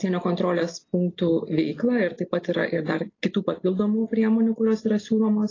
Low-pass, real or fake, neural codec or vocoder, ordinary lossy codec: 7.2 kHz; real; none; AAC, 32 kbps